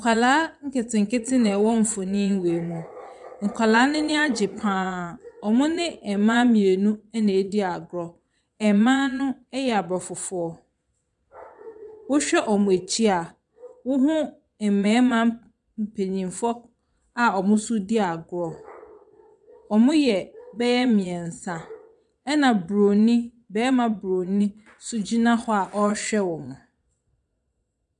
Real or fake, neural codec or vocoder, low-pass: fake; vocoder, 22.05 kHz, 80 mel bands, Vocos; 9.9 kHz